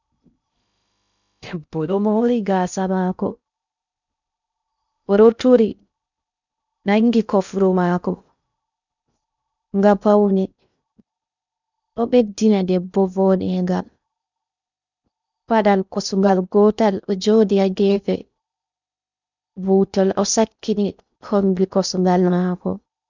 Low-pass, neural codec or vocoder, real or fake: 7.2 kHz; codec, 16 kHz in and 24 kHz out, 0.6 kbps, FocalCodec, streaming, 2048 codes; fake